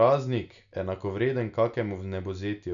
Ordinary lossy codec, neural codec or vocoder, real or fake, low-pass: none; none; real; 7.2 kHz